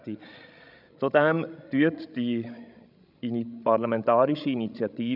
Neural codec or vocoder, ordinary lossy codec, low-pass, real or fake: codec, 16 kHz, 16 kbps, FreqCodec, larger model; none; 5.4 kHz; fake